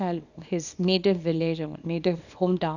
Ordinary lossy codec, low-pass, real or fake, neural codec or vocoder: none; 7.2 kHz; fake; codec, 24 kHz, 0.9 kbps, WavTokenizer, small release